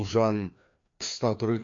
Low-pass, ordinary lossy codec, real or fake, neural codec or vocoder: 7.2 kHz; AAC, 48 kbps; fake; codec, 16 kHz, 2 kbps, FreqCodec, larger model